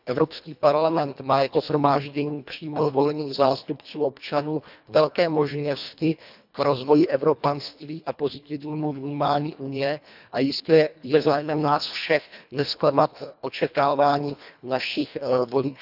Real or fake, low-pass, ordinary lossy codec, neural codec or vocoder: fake; 5.4 kHz; none; codec, 24 kHz, 1.5 kbps, HILCodec